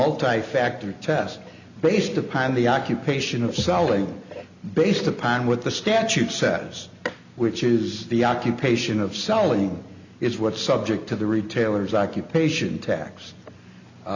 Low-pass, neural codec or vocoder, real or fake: 7.2 kHz; none; real